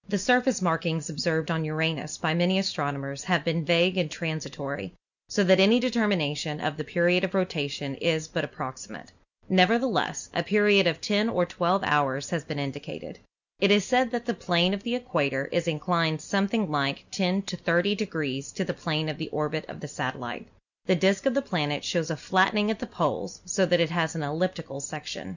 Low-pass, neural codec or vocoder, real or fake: 7.2 kHz; none; real